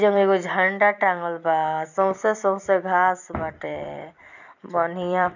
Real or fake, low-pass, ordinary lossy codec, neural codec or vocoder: fake; 7.2 kHz; none; vocoder, 44.1 kHz, 128 mel bands every 256 samples, BigVGAN v2